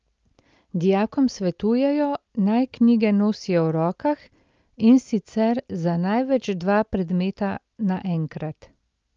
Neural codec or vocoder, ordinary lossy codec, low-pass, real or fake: none; Opus, 24 kbps; 7.2 kHz; real